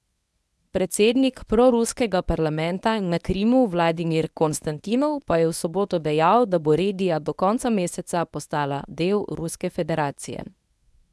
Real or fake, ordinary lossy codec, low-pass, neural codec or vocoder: fake; none; none; codec, 24 kHz, 0.9 kbps, WavTokenizer, medium speech release version 1